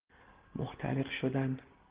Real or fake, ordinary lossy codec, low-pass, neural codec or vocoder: real; Opus, 16 kbps; 3.6 kHz; none